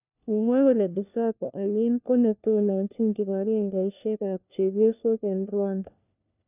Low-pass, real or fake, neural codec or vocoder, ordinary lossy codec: 3.6 kHz; fake; codec, 16 kHz, 1 kbps, FunCodec, trained on LibriTTS, 50 frames a second; none